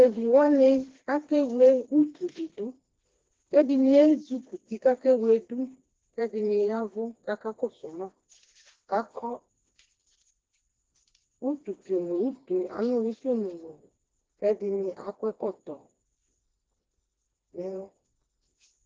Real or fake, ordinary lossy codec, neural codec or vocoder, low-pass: fake; Opus, 16 kbps; codec, 16 kHz, 2 kbps, FreqCodec, smaller model; 7.2 kHz